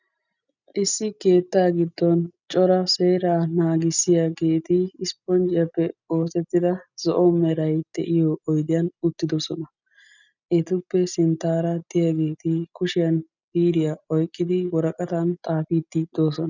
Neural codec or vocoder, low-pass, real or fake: none; 7.2 kHz; real